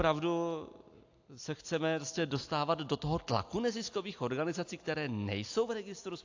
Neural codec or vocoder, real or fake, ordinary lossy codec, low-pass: none; real; AAC, 48 kbps; 7.2 kHz